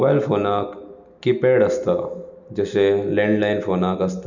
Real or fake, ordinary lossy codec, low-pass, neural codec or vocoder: real; none; 7.2 kHz; none